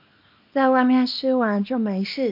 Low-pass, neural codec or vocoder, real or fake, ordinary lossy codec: 5.4 kHz; codec, 24 kHz, 0.9 kbps, WavTokenizer, small release; fake; MP3, 48 kbps